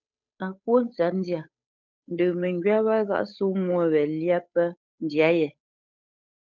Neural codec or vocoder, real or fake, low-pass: codec, 16 kHz, 8 kbps, FunCodec, trained on Chinese and English, 25 frames a second; fake; 7.2 kHz